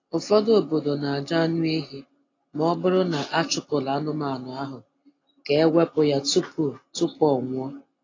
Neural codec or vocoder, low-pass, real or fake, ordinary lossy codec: none; 7.2 kHz; real; AAC, 32 kbps